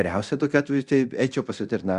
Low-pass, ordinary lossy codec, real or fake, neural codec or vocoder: 10.8 kHz; Opus, 64 kbps; fake; codec, 24 kHz, 0.9 kbps, DualCodec